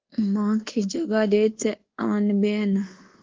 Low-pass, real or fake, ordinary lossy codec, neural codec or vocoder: 7.2 kHz; fake; Opus, 16 kbps; codec, 24 kHz, 1.2 kbps, DualCodec